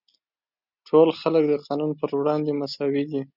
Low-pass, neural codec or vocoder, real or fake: 5.4 kHz; none; real